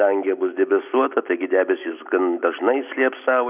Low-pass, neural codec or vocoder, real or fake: 3.6 kHz; none; real